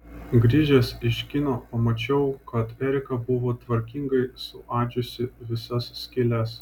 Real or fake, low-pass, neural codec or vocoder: real; 19.8 kHz; none